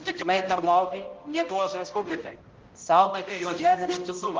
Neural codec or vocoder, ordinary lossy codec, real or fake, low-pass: codec, 16 kHz, 0.5 kbps, X-Codec, HuBERT features, trained on balanced general audio; Opus, 32 kbps; fake; 7.2 kHz